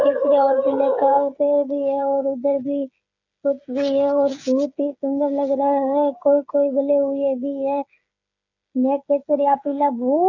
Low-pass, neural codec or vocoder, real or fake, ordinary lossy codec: 7.2 kHz; codec, 16 kHz, 8 kbps, FreqCodec, smaller model; fake; none